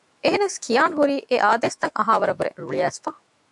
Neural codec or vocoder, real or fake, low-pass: autoencoder, 48 kHz, 128 numbers a frame, DAC-VAE, trained on Japanese speech; fake; 10.8 kHz